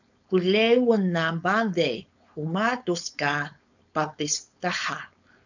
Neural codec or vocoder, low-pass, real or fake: codec, 16 kHz, 4.8 kbps, FACodec; 7.2 kHz; fake